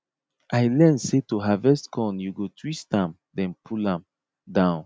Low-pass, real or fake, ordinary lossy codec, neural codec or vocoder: none; real; none; none